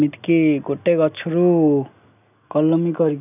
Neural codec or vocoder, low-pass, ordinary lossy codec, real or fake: none; 3.6 kHz; none; real